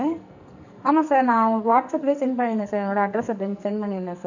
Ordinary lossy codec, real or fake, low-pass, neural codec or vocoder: none; fake; 7.2 kHz; codec, 44.1 kHz, 2.6 kbps, SNAC